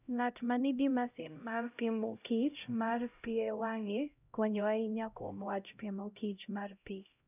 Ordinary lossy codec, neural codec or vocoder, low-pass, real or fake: none; codec, 16 kHz, 0.5 kbps, X-Codec, HuBERT features, trained on LibriSpeech; 3.6 kHz; fake